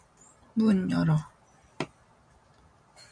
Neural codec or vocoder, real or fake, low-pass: none; real; 9.9 kHz